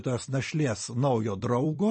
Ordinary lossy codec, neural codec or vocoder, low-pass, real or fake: MP3, 32 kbps; vocoder, 44.1 kHz, 128 mel bands every 256 samples, BigVGAN v2; 9.9 kHz; fake